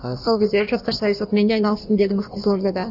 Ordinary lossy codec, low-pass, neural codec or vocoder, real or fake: none; 5.4 kHz; codec, 16 kHz in and 24 kHz out, 1.1 kbps, FireRedTTS-2 codec; fake